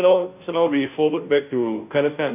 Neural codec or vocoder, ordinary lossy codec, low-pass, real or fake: codec, 16 kHz, 0.5 kbps, FunCodec, trained on Chinese and English, 25 frames a second; none; 3.6 kHz; fake